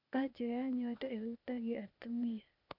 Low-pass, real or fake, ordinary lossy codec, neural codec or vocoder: 5.4 kHz; fake; none; codec, 16 kHz, 0.8 kbps, ZipCodec